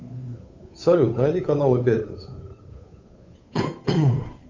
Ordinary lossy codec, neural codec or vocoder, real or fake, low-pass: MP3, 48 kbps; codec, 16 kHz, 8 kbps, FunCodec, trained on Chinese and English, 25 frames a second; fake; 7.2 kHz